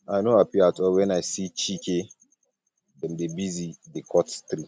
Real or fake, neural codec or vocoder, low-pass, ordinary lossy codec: real; none; none; none